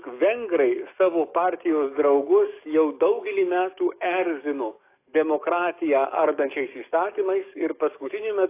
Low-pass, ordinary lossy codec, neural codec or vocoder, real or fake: 3.6 kHz; AAC, 24 kbps; codec, 44.1 kHz, 7.8 kbps, DAC; fake